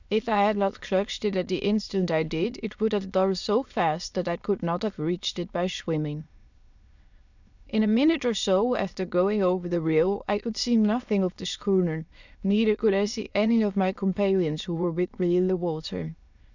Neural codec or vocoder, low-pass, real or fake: autoencoder, 22.05 kHz, a latent of 192 numbers a frame, VITS, trained on many speakers; 7.2 kHz; fake